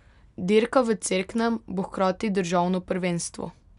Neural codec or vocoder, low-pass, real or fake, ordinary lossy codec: none; 10.8 kHz; real; none